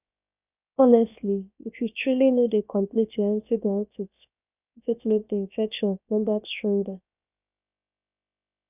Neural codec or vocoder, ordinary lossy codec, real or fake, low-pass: codec, 16 kHz, 0.7 kbps, FocalCodec; none; fake; 3.6 kHz